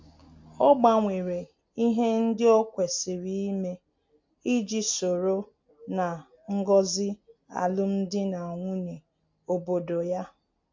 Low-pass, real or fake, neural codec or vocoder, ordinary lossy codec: 7.2 kHz; real; none; MP3, 48 kbps